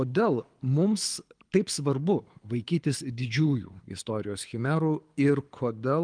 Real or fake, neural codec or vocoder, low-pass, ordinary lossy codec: fake; codec, 24 kHz, 6 kbps, HILCodec; 9.9 kHz; Opus, 32 kbps